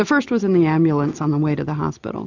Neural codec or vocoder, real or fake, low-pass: none; real; 7.2 kHz